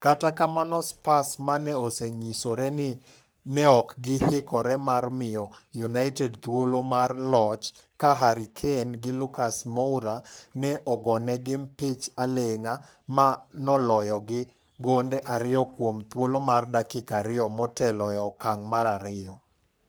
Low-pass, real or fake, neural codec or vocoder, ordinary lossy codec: none; fake; codec, 44.1 kHz, 3.4 kbps, Pupu-Codec; none